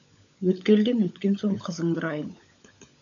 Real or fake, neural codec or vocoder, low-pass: fake; codec, 16 kHz, 16 kbps, FunCodec, trained on LibriTTS, 50 frames a second; 7.2 kHz